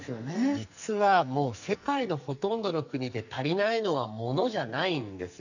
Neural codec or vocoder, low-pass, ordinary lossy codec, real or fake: codec, 44.1 kHz, 2.6 kbps, SNAC; 7.2 kHz; none; fake